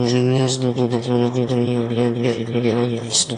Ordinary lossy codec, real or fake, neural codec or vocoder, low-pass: AAC, 48 kbps; fake; autoencoder, 22.05 kHz, a latent of 192 numbers a frame, VITS, trained on one speaker; 9.9 kHz